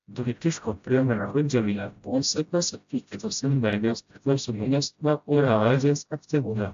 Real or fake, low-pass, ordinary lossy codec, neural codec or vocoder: fake; 7.2 kHz; AAC, 64 kbps; codec, 16 kHz, 0.5 kbps, FreqCodec, smaller model